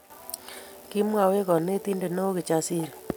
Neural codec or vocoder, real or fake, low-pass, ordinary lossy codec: none; real; none; none